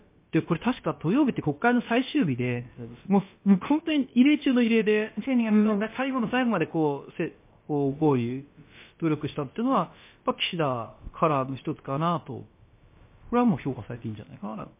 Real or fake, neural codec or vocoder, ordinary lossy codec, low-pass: fake; codec, 16 kHz, about 1 kbps, DyCAST, with the encoder's durations; MP3, 24 kbps; 3.6 kHz